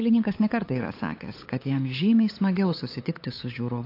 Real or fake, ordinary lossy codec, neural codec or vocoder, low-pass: fake; AAC, 32 kbps; codec, 16 kHz, 8 kbps, FunCodec, trained on LibriTTS, 25 frames a second; 5.4 kHz